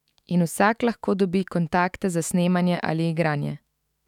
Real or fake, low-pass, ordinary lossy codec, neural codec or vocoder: fake; 19.8 kHz; none; autoencoder, 48 kHz, 128 numbers a frame, DAC-VAE, trained on Japanese speech